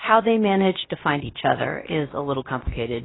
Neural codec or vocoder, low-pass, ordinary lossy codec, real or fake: codec, 16 kHz, about 1 kbps, DyCAST, with the encoder's durations; 7.2 kHz; AAC, 16 kbps; fake